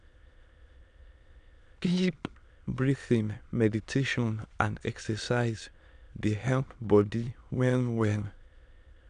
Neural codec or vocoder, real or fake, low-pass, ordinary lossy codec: autoencoder, 22.05 kHz, a latent of 192 numbers a frame, VITS, trained on many speakers; fake; 9.9 kHz; none